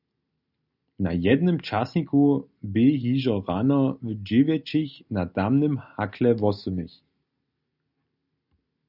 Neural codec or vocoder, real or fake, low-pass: none; real; 5.4 kHz